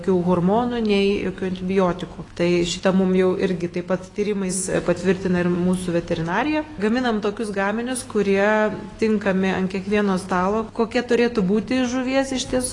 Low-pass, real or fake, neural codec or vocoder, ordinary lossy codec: 10.8 kHz; fake; autoencoder, 48 kHz, 128 numbers a frame, DAC-VAE, trained on Japanese speech; AAC, 32 kbps